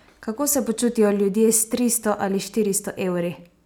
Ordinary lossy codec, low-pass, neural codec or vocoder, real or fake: none; none; none; real